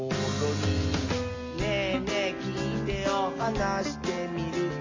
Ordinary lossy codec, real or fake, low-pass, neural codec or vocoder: MP3, 32 kbps; real; 7.2 kHz; none